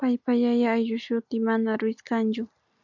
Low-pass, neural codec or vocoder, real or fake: 7.2 kHz; none; real